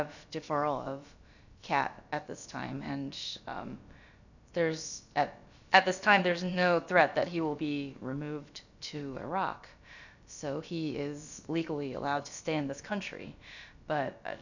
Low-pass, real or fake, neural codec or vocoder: 7.2 kHz; fake; codec, 16 kHz, about 1 kbps, DyCAST, with the encoder's durations